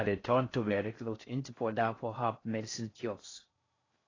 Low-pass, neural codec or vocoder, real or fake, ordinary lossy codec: 7.2 kHz; codec, 16 kHz in and 24 kHz out, 0.6 kbps, FocalCodec, streaming, 4096 codes; fake; AAC, 32 kbps